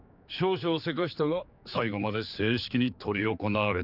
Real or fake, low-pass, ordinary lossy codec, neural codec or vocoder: fake; 5.4 kHz; none; codec, 16 kHz, 4 kbps, X-Codec, HuBERT features, trained on general audio